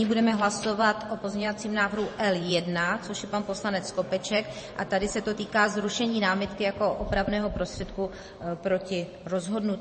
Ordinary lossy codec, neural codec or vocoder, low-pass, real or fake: MP3, 32 kbps; vocoder, 44.1 kHz, 128 mel bands every 512 samples, BigVGAN v2; 10.8 kHz; fake